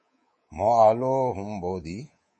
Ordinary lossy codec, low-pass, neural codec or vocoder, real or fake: MP3, 32 kbps; 10.8 kHz; codec, 24 kHz, 3.1 kbps, DualCodec; fake